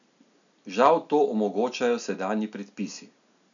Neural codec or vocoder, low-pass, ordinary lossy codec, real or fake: none; 7.2 kHz; none; real